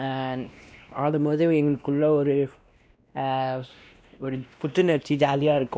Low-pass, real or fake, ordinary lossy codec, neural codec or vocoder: none; fake; none; codec, 16 kHz, 1 kbps, X-Codec, WavLM features, trained on Multilingual LibriSpeech